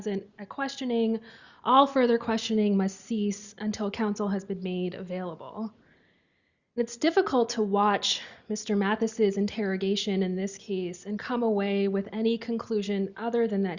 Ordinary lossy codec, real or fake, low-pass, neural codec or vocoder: Opus, 64 kbps; real; 7.2 kHz; none